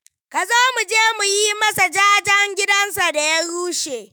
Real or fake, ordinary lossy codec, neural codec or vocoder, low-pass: fake; none; autoencoder, 48 kHz, 128 numbers a frame, DAC-VAE, trained on Japanese speech; none